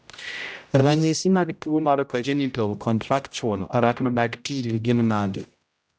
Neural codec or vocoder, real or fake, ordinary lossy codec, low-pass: codec, 16 kHz, 0.5 kbps, X-Codec, HuBERT features, trained on general audio; fake; none; none